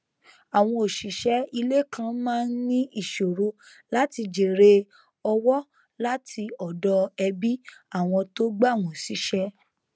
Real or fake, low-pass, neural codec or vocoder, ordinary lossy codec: real; none; none; none